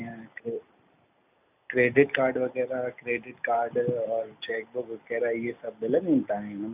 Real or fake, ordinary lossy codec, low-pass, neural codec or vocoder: real; none; 3.6 kHz; none